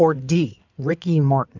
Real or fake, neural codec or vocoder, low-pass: fake; codec, 16 kHz in and 24 kHz out, 2.2 kbps, FireRedTTS-2 codec; 7.2 kHz